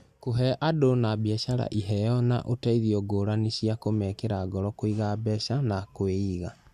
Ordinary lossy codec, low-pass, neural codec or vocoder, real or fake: Opus, 64 kbps; 14.4 kHz; none; real